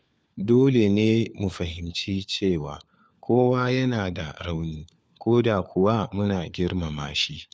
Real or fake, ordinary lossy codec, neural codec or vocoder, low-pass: fake; none; codec, 16 kHz, 4 kbps, FunCodec, trained on LibriTTS, 50 frames a second; none